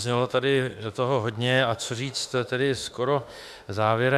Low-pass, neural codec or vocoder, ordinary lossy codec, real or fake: 14.4 kHz; autoencoder, 48 kHz, 32 numbers a frame, DAC-VAE, trained on Japanese speech; AAC, 96 kbps; fake